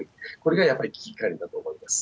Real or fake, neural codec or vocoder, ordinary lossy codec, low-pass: real; none; none; none